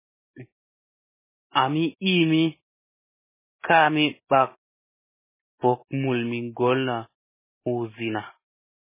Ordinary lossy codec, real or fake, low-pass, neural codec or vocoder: MP3, 16 kbps; real; 3.6 kHz; none